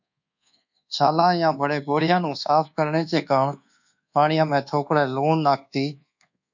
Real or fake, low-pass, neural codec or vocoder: fake; 7.2 kHz; codec, 24 kHz, 1.2 kbps, DualCodec